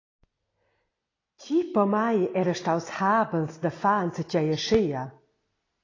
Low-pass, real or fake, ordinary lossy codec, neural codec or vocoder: 7.2 kHz; real; AAC, 32 kbps; none